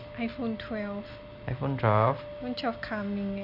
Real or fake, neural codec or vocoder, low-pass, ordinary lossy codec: real; none; 5.4 kHz; none